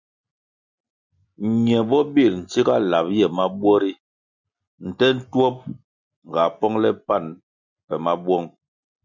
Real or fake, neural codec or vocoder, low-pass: real; none; 7.2 kHz